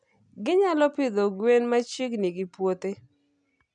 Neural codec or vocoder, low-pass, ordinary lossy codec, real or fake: none; 9.9 kHz; none; real